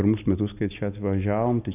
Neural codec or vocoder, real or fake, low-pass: none; real; 3.6 kHz